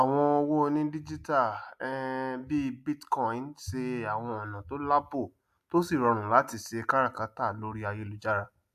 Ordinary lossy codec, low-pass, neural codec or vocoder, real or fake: AAC, 96 kbps; 14.4 kHz; none; real